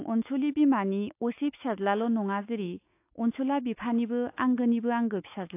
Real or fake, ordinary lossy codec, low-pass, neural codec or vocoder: fake; AAC, 24 kbps; 3.6 kHz; autoencoder, 48 kHz, 128 numbers a frame, DAC-VAE, trained on Japanese speech